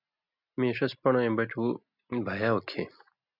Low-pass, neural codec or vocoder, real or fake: 5.4 kHz; none; real